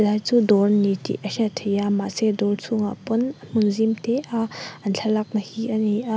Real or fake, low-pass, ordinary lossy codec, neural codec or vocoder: real; none; none; none